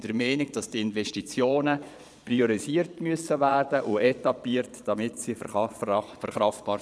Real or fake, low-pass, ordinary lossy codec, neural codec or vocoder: fake; none; none; vocoder, 22.05 kHz, 80 mel bands, Vocos